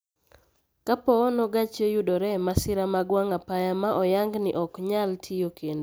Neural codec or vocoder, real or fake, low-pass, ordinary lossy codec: none; real; none; none